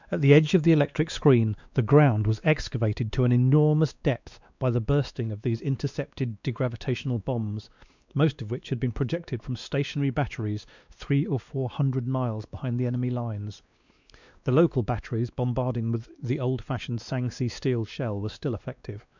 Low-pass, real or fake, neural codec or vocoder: 7.2 kHz; fake; codec, 16 kHz, 2 kbps, X-Codec, WavLM features, trained on Multilingual LibriSpeech